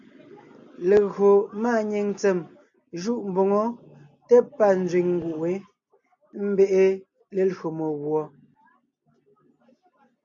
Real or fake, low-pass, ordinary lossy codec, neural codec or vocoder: real; 7.2 kHz; AAC, 64 kbps; none